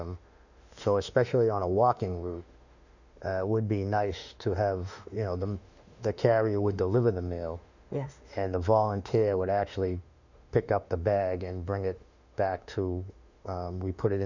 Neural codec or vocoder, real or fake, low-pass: autoencoder, 48 kHz, 32 numbers a frame, DAC-VAE, trained on Japanese speech; fake; 7.2 kHz